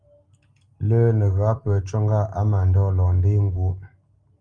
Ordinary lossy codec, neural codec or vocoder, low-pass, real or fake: Opus, 32 kbps; none; 9.9 kHz; real